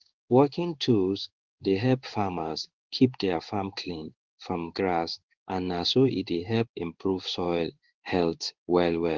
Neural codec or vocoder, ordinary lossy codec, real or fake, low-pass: codec, 16 kHz in and 24 kHz out, 1 kbps, XY-Tokenizer; Opus, 16 kbps; fake; 7.2 kHz